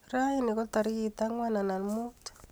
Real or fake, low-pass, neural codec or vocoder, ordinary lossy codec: real; none; none; none